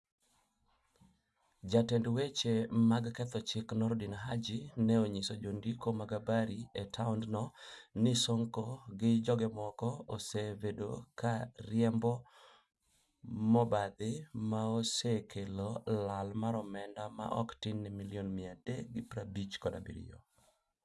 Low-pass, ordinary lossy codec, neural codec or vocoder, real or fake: none; none; none; real